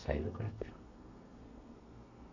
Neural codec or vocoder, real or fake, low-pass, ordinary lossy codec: codec, 32 kHz, 1.9 kbps, SNAC; fake; 7.2 kHz; none